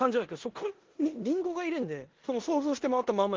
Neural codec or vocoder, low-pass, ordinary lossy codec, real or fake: codec, 16 kHz in and 24 kHz out, 0.9 kbps, LongCat-Audio-Codec, four codebook decoder; 7.2 kHz; Opus, 16 kbps; fake